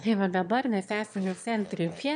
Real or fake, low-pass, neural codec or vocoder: fake; 9.9 kHz; autoencoder, 22.05 kHz, a latent of 192 numbers a frame, VITS, trained on one speaker